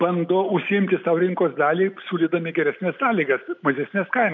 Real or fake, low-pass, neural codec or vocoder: real; 7.2 kHz; none